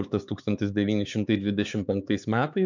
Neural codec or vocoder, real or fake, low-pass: codec, 16 kHz, 4 kbps, X-Codec, HuBERT features, trained on LibriSpeech; fake; 7.2 kHz